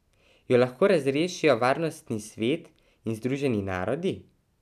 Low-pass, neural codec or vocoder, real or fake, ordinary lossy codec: 14.4 kHz; none; real; none